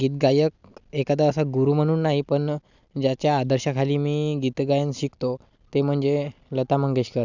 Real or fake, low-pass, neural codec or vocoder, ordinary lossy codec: real; 7.2 kHz; none; none